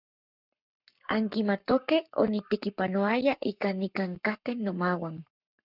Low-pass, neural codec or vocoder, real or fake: 5.4 kHz; codec, 44.1 kHz, 7.8 kbps, Pupu-Codec; fake